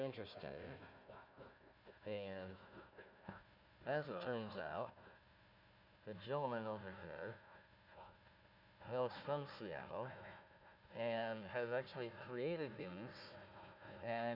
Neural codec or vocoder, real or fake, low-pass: codec, 16 kHz, 1 kbps, FunCodec, trained on Chinese and English, 50 frames a second; fake; 5.4 kHz